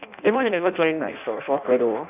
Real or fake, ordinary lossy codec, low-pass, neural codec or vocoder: fake; none; 3.6 kHz; codec, 16 kHz in and 24 kHz out, 0.6 kbps, FireRedTTS-2 codec